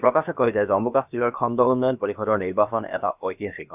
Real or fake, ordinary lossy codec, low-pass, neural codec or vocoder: fake; none; 3.6 kHz; codec, 16 kHz, about 1 kbps, DyCAST, with the encoder's durations